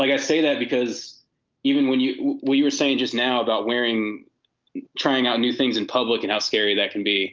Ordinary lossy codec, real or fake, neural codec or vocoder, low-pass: Opus, 24 kbps; real; none; 7.2 kHz